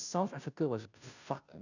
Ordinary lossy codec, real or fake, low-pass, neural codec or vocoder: none; fake; 7.2 kHz; codec, 16 kHz, 0.5 kbps, FunCodec, trained on Chinese and English, 25 frames a second